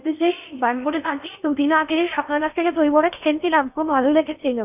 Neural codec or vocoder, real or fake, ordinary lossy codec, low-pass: codec, 16 kHz in and 24 kHz out, 0.8 kbps, FocalCodec, streaming, 65536 codes; fake; none; 3.6 kHz